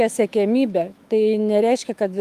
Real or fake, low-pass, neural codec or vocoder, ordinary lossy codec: fake; 14.4 kHz; autoencoder, 48 kHz, 128 numbers a frame, DAC-VAE, trained on Japanese speech; Opus, 32 kbps